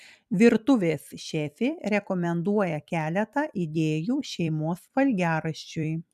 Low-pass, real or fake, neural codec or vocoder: 14.4 kHz; fake; vocoder, 44.1 kHz, 128 mel bands every 256 samples, BigVGAN v2